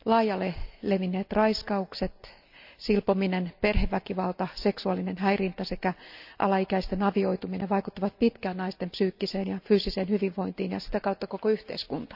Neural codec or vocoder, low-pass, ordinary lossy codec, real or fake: none; 5.4 kHz; none; real